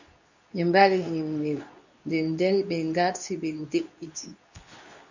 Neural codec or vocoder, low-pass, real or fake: codec, 24 kHz, 0.9 kbps, WavTokenizer, medium speech release version 2; 7.2 kHz; fake